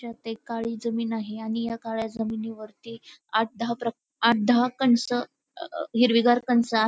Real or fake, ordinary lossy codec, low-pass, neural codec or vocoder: real; none; none; none